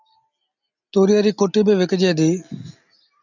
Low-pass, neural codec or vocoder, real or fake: 7.2 kHz; none; real